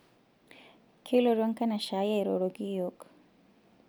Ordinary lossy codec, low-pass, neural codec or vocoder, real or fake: none; none; none; real